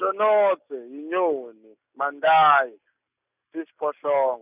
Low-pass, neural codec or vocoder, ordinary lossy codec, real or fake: 3.6 kHz; none; none; real